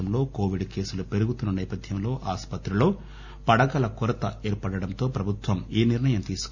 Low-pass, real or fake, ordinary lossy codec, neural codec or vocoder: 7.2 kHz; real; none; none